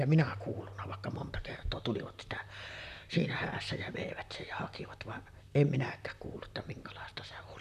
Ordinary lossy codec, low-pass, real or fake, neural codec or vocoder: none; 14.4 kHz; fake; vocoder, 44.1 kHz, 128 mel bands, Pupu-Vocoder